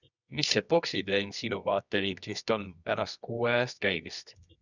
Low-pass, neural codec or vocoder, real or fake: 7.2 kHz; codec, 24 kHz, 0.9 kbps, WavTokenizer, medium music audio release; fake